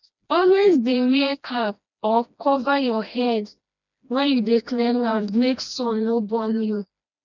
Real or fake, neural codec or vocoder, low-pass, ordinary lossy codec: fake; codec, 16 kHz, 1 kbps, FreqCodec, smaller model; 7.2 kHz; none